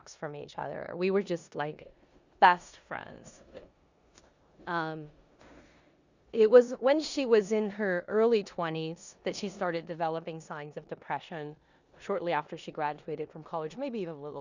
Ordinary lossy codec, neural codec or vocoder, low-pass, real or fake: Opus, 64 kbps; codec, 16 kHz in and 24 kHz out, 0.9 kbps, LongCat-Audio-Codec, four codebook decoder; 7.2 kHz; fake